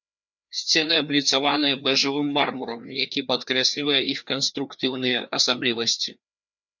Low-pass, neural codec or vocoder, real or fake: 7.2 kHz; codec, 16 kHz, 2 kbps, FreqCodec, larger model; fake